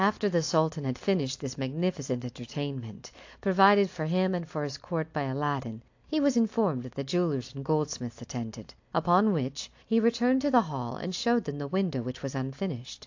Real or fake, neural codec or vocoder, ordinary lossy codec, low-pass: real; none; AAC, 48 kbps; 7.2 kHz